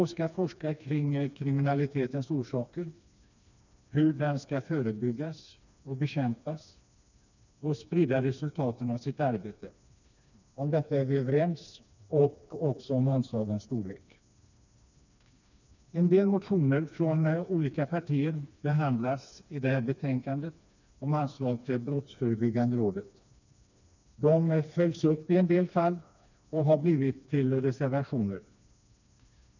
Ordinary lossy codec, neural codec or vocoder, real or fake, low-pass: none; codec, 16 kHz, 2 kbps, FreqCodec, smaller model; fake; 7.2 kHz